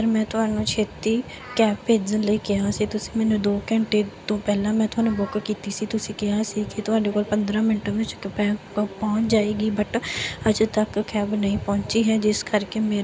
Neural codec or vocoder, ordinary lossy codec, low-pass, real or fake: none; none; none; real